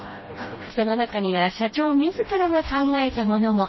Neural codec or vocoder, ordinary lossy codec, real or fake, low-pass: codec, 16 kHz, 1 kbps, FreqCodec, smaller model; MP3, 24 kbps; fake; 7.2 kHz